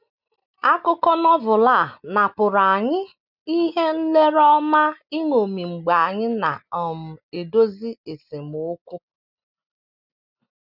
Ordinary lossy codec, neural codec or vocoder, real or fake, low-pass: none; none; real; 5.4 kHz